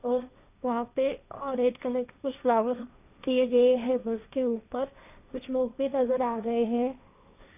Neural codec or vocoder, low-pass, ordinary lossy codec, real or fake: codec, 16 kHz, 1.1 kbps, Voila-Tokenizer; 3.6 kHz; none; fake